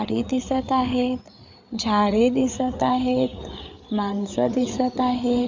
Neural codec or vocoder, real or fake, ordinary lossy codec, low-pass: codec, 16 kHz, 8 kbps, FreqCodec, larger model; fake; MP3, 64 kbps; 7.2 kHz